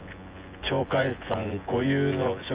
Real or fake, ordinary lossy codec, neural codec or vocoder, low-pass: fake; Opus, 24 kbps; vocoder, 24 kHz, 100 mel bands, Vocos; 3.6 kHz